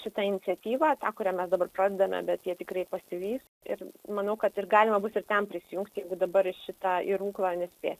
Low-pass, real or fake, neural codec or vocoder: 14.4 kHz; real; none